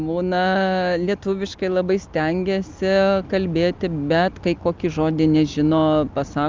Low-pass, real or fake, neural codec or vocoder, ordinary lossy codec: 7.2 kHz; real; none; Opus, 32 kbps